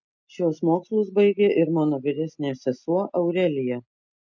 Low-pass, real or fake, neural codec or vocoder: 7.2 kHz; real; none